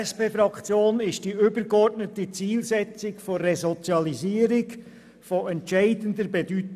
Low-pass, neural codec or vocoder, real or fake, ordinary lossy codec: 14.4 kHz; none; real; none